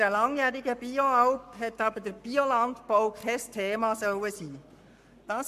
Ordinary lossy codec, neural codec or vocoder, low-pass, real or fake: MP3, 96 kbps; codec, 44.1 kHz, 7.8 kbps, Pupu-Codec; 14.4 kHz; fake